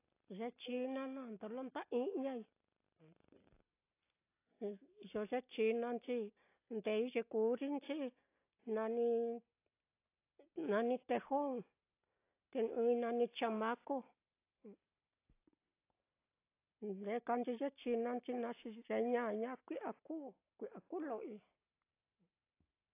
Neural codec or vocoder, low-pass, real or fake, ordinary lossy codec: none; 3.6 kHz; real; AAC, 24 kbps